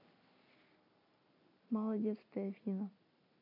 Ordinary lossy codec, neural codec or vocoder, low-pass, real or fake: none; vocoder, 44.1 kHz, 128 mel bands every 256 samples, BigVGAN v2; 5.4 kHz; fake